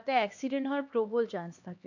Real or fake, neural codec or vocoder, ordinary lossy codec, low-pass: fake; codec, 16 kHz, 2 kbps, X-Codec, HuBERT features, trained on LibriSpeech; none; 7.2 kHz